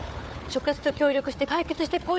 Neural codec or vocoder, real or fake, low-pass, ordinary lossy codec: codec, 16 kHz, 4 kbps, FunCodec, trained on Chinese and English, 50 frames a second; fake; none; none